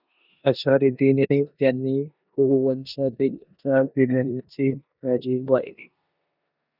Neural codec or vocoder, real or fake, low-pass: codec, 16 kHz in and 24 kHz out, 0.9 kbps, LongCat-Audio-Codec, four codebook decoder; fake; 5.4 kHz